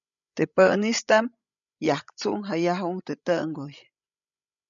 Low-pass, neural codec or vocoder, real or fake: 7.2 kHz; codec, 16 kHz, 16 kbps, FreqCodec, larger model; fake